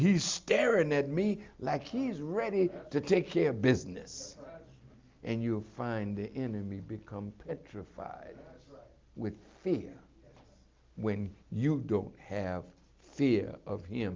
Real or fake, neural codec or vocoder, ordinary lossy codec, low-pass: real; none; Opus, 32 kbps; 7.2 kHz